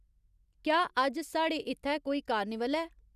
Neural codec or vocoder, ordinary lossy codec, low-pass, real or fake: none; none; 14.4 kHz; real